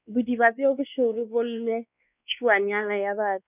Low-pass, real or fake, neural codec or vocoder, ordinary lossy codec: 3.6 kHz; fake; codec, 16 kHz, 2 kbps, X-Codec, WavLM features, trained on Multilingual LibriSpeech; none